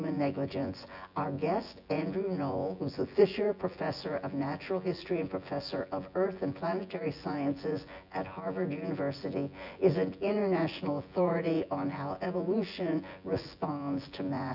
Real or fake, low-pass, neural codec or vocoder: fake; 5.4 kHz; vocoder, 24 kHz, 100 mel bands, Vocos